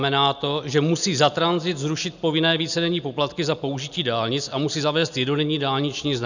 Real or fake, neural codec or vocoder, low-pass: real; none; 7.2 kHz